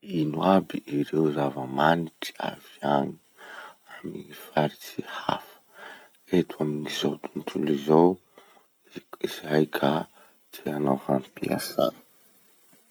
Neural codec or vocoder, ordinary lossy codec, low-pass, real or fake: none; none; none; real